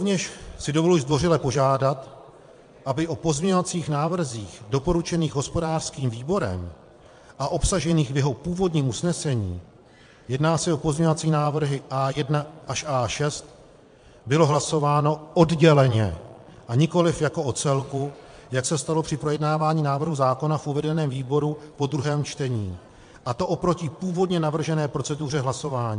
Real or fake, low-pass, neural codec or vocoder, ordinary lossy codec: fake; 9.9 kHz; vocoder, 22.05 kHz, 80 mel bands, Vocos; MP3, 64 kbps